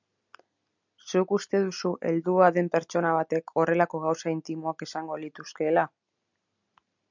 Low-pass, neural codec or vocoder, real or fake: 7.2 kHz; none; real